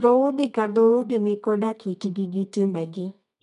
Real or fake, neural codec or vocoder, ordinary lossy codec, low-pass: fake; codec, 24 kHz, 0.9 kbps, WavTokenizer, medium music audio release; none; 10.8 kHz